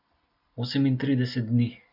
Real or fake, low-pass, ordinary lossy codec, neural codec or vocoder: real; 5.4 kHz; none; none